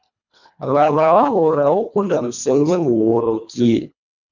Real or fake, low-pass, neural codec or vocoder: fake; 7.2 kHz; codec, 24 kHz, 1.5 kbps, HILCodec